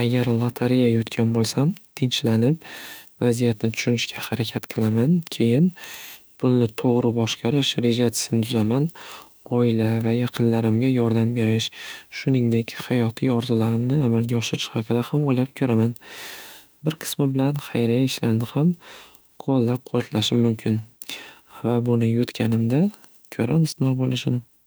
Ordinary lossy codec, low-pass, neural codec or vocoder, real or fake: none; none; autoencoder, 48 kHz, 32 numbers a frame, DAC-VAE, trained on Japanese speech; fake